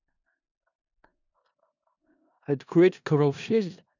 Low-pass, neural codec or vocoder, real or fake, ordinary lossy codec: 7.2 kHz; codec, 16 kHz in and 24 kHz out, 0.4 kbps, LongCat-Audio-Codec, four codebook decoder; fake; none